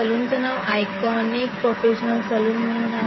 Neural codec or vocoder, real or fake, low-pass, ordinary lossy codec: codec, 16 kHz, 16 kbps, FreqCodec, smaller model; fake; 7.2 kHz; MP3, 24 kbps